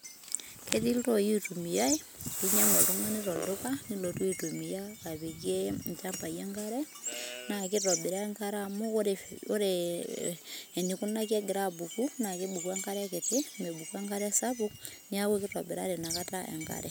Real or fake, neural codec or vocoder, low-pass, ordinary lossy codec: real; none; none; none